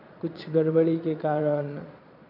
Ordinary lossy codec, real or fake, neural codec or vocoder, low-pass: none; fake; vocoder, 22.05 kHz, 80 mel bands, Vocos; 5.4 kHz